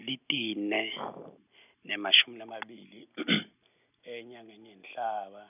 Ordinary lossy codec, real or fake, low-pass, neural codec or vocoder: none; real; 3.6 kHz; none